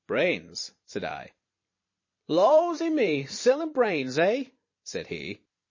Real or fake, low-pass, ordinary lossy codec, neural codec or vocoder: fake; 7.2 kHz; MP3, 32 kbps; vocoder, 44.1 kHz, 128 mel bands every 512 samples, BigVGAN v2